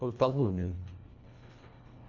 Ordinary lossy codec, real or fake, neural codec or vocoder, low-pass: none; fake; codec, 24 kHz, 1.5 kbps, HILCodec; 7.2 kHz